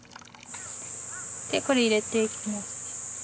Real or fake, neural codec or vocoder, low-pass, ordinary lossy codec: real; none; none; none